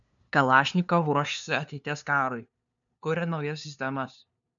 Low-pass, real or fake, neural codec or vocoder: 7.2 kHz; fake; codec, 16 kHz, 2 kbps, FunCodec, trained on LibriTTS, 25 frames a second